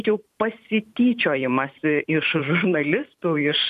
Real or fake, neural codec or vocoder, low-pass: fake; vocoder, 44.1 kHz, 128 mel bands every 512 samples, BigVGAN v2; 14.4 kHz